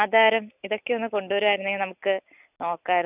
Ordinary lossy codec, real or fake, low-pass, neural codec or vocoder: none; real; 3.6 kHz; none